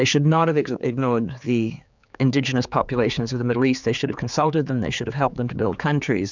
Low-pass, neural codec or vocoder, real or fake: 7.2 kHz; codec, 16 kHz, 4 kbps, X-Codec, HuBERT features, trained on general audio; fake